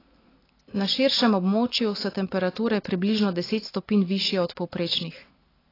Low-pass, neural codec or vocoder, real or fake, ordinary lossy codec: 5.4 kHz; none; real; AAC, 24 kbps